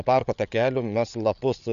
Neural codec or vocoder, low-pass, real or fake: codec, 16 kHz, 8 kbps, FreqCodec, larger model; 7.2 kHz; fake